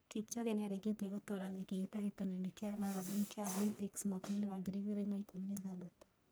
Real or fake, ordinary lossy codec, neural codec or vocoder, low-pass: fake; none; codec, 44.1 kHz, 1.7 kbps, Pupu-Codec; none